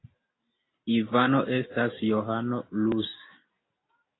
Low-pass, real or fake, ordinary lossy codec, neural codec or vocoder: 7.2 kHz; real; AAC, 16 kbps; none